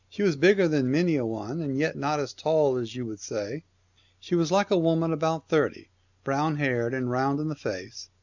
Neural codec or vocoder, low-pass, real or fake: none; 7.2 kHz; real